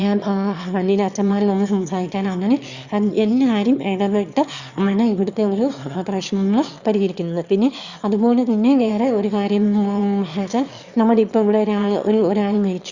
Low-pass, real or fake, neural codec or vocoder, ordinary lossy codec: 7.2 kHz; fake; autoencoder, 22.05 kHz, a latent of 192 numbers a frame, VITS, trained on one speaker; Opus, 64 kbps